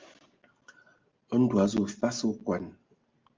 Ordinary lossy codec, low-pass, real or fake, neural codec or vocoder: Opus, 24 kbps; 7.2 kHz; real; none